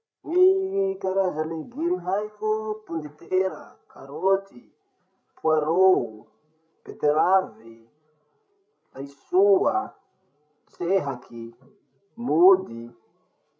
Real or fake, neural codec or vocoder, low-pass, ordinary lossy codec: fake; codec, 16 kHz, 16 kbps, FreqCodec, larger model; none; none